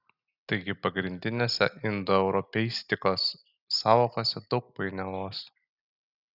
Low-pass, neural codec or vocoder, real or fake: 5.4 kHz; none; real